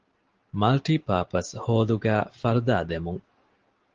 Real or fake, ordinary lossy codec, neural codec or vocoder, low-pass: real; Opus, 16 kbps; none; 7.2 kHz